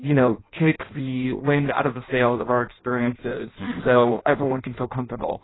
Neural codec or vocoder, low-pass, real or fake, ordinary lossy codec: codec, 16 kHz in and 24 kHz out, 0.6 kbps, FireRedTTS-2 codec; 7.2 kHz; fake; AAC, 16 kbps